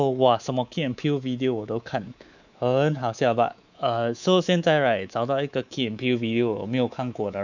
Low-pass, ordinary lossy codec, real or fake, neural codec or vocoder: 7.2 kHz; none; fake; codec, 24 kHz, 3.1 kbps, DualCodec